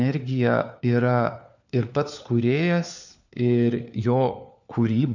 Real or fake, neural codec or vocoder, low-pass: fake; codec, 16 kHz, 4 kbps, FunCodec, trained on LibriTTS, 50 frames a second; 7.2 kHz